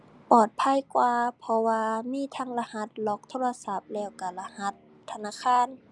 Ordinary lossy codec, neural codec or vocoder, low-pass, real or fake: none; none; none; real